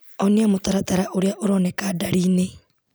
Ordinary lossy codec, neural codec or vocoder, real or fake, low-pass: none; none; real; none